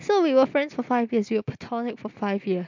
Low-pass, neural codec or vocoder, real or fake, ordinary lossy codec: 7.2 kHz; none; real; MP3, 64 kbps